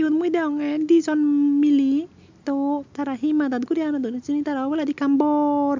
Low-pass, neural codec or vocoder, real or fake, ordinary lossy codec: 7.2 kHz; none; real; MP3, 64 kbps